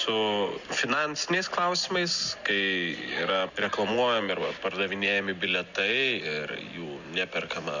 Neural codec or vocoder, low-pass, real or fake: none; 7.2 kHz; real